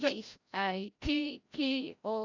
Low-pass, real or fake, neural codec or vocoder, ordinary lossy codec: 7.2 kHz; fake; codec, 16 kHz, 0.5 kbps, FreqCodec, larger model; none